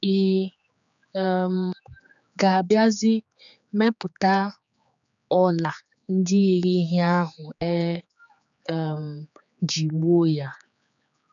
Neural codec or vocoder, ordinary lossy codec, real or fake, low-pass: codec, 16 kHz, 4 kbps, X-Codec, HuBERT features, trained on general audio; none; fake; 7.2 kHz